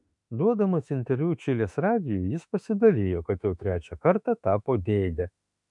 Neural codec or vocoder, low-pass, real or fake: autoencoder, 48 kHz, 32 numbers a frame, DAC-VAE, trained on Japanese speech; 10.8 kHz; fake